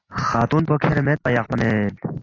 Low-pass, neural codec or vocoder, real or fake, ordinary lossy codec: 7.2 kHz; none; real; AAC, 32 kbps